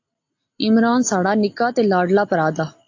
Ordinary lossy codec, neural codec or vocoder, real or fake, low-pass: AAC, 48 kbps; none; real; 7.2 kHz